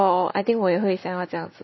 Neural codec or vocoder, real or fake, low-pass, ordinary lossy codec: none; real; 7.2 kHz; MP3, 24 kbps